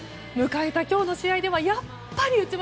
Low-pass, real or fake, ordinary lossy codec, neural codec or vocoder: none; real; none; none